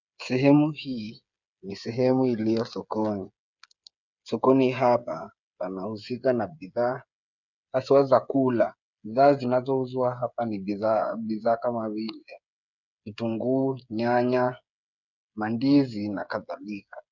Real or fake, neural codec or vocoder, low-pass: fake; codec, 16 kHz, 8 kbps, FreqCodec, smaller model; 7.2 kHz